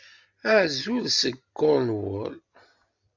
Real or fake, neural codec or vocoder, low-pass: real; none; 7.2 kHz